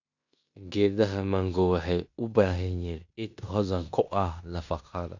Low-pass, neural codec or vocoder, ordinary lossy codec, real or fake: 7.2 kHz; codec, 16 kHz in and 24 kHz out, 0.9 kbps, LongCat-Audio-Codec, fine tuned four codebook decoder; none; fake